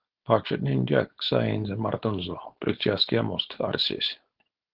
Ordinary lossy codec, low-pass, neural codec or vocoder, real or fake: Opus, 16 kbps; 5.4 kHz; codec, 16 kHz, 4.8 kbps, FACodec; fake